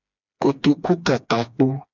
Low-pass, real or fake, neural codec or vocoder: 7.2 kHz; fake; codec, 16 kHz, 2 kbps, FreqCodec, smaller model